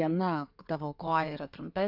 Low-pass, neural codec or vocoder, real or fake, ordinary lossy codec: 5.4 kHz; codec, 16 kHz in and 24 kHz out, 2.2 kbps, FireRedTTS-2 codec; fake; Opus, 64 kbps